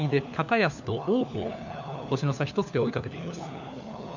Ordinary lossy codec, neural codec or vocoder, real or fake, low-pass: none; codec, 16 kHz, 4 kbps, FunCodec, trained on LibriTTS, 50 frames a second; fake; 7.2 kHz